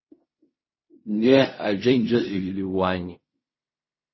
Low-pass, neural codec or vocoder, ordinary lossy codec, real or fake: 7.2 kHz; codec, 16 kHz in and 24 kHz out, 0.4 kbps, LongCat-Audio-Codec, fine tuned four codebook decoder; MP3, 24 kbps; fake